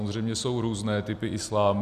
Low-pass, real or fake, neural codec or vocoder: 14.4 kHz; real; none